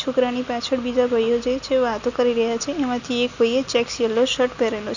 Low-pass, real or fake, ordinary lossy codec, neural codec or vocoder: 7.2 kHz; real; none; none